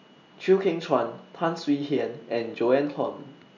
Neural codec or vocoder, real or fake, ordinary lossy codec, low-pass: none; real; none; 7.2 kHz